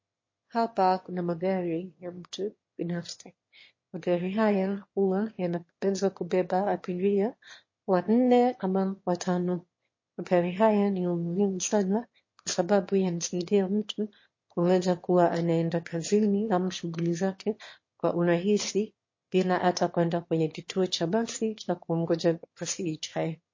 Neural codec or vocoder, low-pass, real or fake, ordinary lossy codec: autoencoder, 22.05 kHz, a latent of 192 numbers a frame, VITS, trained on one speaker; 7.2 kHz; fake; MP3, 32 kbps